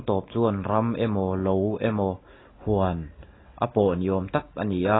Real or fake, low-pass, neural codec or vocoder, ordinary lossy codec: real; 7.2 kHz; none; AAC, 16 kbps